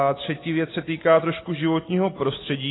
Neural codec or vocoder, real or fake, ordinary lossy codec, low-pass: none; real; AAC, 16 kbps; 7.2 kHz